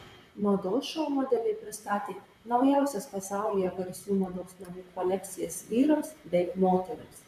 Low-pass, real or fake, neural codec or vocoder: 14.4 kHz; fake; vocoder, 44.1 kHz, 128 mel bands, Pupu-Vocoder